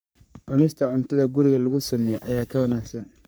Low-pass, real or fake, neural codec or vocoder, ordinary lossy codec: none; fake; codec, 44.1 kHz, 3.4 kbps, Pupu-Codec; none